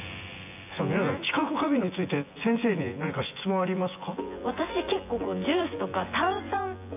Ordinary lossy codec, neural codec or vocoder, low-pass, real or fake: AAC, 32 kbps; vocoder, 24 kHz, 100 mel bands, Vocos; 3.6 kHz; fake